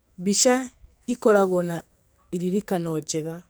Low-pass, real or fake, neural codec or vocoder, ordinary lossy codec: none; fake; codec, 44.1 kHz, 2.6 kbps, SNAC; none